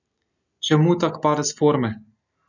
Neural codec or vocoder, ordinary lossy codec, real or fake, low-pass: none; none; real; 7.2 kHz